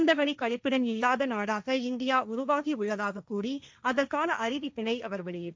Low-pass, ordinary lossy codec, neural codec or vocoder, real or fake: none; none; codec, 16 kHz, 1.1 kbps, Voila-Tokenizer; fake